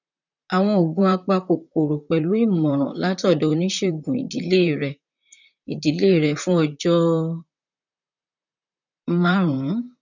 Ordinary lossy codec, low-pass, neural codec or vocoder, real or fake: none; 7.2 kHz; vocoder, 44.1 kHz, 128 mel bands, Pupu-Vocoder; fake